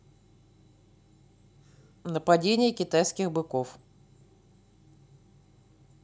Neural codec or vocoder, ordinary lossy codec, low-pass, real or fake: none; none; none; real